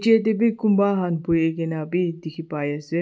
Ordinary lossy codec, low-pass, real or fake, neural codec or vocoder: none; none; real; none